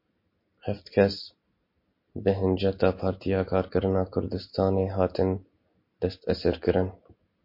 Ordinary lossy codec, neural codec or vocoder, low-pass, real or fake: MP3, 32 kbps; none; 5.4 kHz; real